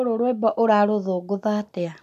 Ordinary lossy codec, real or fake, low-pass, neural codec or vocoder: none; real; 14.4 kHz; none